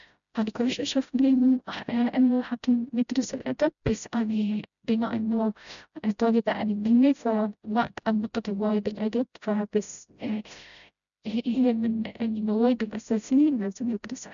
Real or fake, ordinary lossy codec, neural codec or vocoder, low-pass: fake; none; codec, 16 kHz, 0.5 kbps, FreqCodec, smaller model; 7.2 kHz